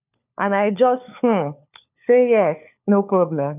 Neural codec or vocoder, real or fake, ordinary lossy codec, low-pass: codec, 16 kHz, 4 kbps, FunCodec, trained on LibriTTS, 50 frames a second; fake; none; 3.6 kHz